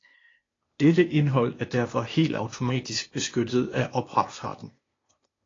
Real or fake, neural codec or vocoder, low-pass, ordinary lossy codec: fake; codec, 16 kHz, 0.8 kbps, ZipCodec; 7.2 kHz; AAC, 32 kbps